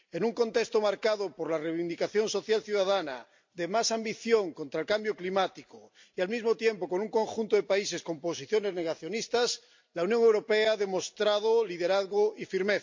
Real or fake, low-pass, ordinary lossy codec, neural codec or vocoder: real; 7.2 kHz; MP3, 48 kbps; none